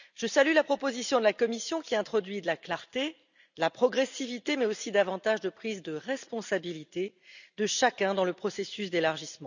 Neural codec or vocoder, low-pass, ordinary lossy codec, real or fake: none; 7.2 kHz; none; real